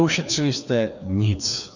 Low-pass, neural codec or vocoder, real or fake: 7.2 kHz; codec, 16 kHz, 2 kbps, FreqCodec, larger model; fake